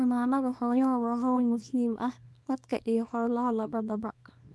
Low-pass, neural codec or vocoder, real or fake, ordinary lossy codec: none; codec, 24 kHz, 0.9 kbps, WavTokenizer, small release; fake; none